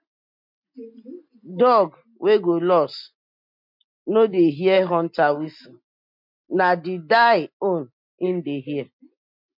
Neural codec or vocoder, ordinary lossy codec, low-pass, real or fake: none; MP3, 48 kbps; 5.4 kHz; real